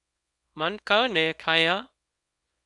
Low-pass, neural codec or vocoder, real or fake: 10.8 kHz; codec, 24 kHz, 0.9 kbps, WavTokenizer, small release; fake